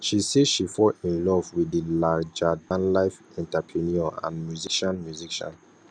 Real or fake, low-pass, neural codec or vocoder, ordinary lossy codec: real; 9.9 kHz; none; none